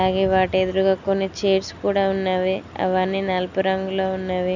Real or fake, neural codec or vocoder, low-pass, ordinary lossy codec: real; none; 7.2 kHz; none